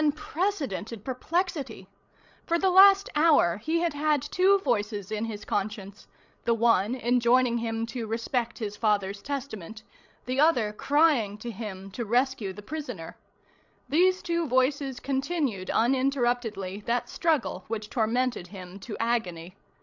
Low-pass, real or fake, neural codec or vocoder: 7.2 kHz; fake; codec, 16 kHz, 16 kbps, FreqCodec, larger model